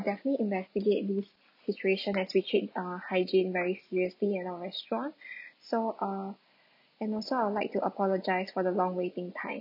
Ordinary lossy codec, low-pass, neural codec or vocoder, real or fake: none; 5.4 kHz; none; real